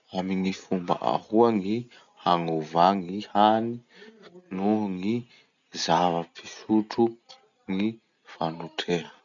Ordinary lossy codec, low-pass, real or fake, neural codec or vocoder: none; 7.2 kHz; real; none